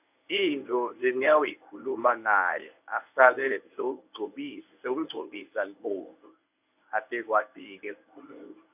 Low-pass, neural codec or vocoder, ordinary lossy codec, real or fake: 3.6 kHz; codec, 24 kHz, 0.9 kbps, WavTokenizer, medium speech release version 1; none; fake